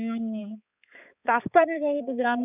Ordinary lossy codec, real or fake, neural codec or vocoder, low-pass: none; fake; codec, 16 kHz, 4 kbps, X-Codec, HuBERT features, trained on balanced general audio; 3.6 kHz